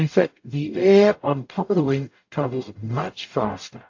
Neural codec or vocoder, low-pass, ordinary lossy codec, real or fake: codec, 44.1 kHz, 0.9 kbps, DAC; 7.2 kHz; AAC, 48 kbps; fake